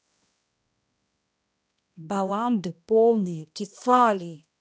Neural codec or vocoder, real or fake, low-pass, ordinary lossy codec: codec, 16 kHz, 1 kbps, X-Codec, HuBERT features, trained on balanced general audio; fake; none; none